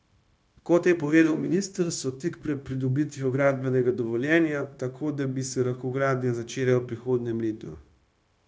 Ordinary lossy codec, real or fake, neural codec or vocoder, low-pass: none; fake; codec, 16 kHz, 0.9 kbps, LongCat-Audio-Codec; none